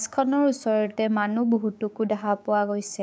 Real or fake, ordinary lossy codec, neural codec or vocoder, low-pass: fake; none; codec, 16 kHz, 6 kbps, DAC; none